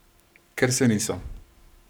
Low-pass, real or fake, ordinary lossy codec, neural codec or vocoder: none; fake; none; codec, 44.1 kHz, 7.8 kbps, Pupu-Codec